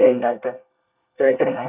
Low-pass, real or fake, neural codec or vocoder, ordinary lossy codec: 3.6 kHz; fake; codec, 24 kHz, 1 kbps, SNAC; none